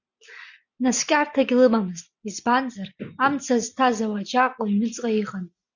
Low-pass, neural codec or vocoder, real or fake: 7.2 kHz; none; real